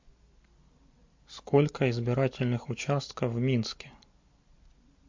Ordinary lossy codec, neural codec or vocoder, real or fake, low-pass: MP3, 48 kbps; none; real; 7.2 kHz